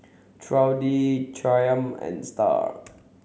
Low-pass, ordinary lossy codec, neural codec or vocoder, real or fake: none; none; none; real